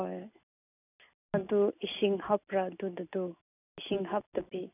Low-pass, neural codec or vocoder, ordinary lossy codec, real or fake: 3.6 kHz; none; none; real